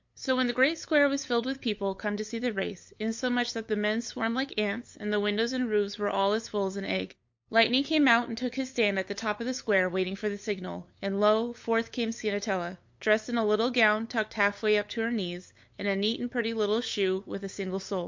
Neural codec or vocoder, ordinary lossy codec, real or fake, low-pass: codec, 16 kHz, 8 kbps, FunCodec, trained on LibriTTS, 25 frames a second; MP3, 48 kbps; fake; 7.2 kHz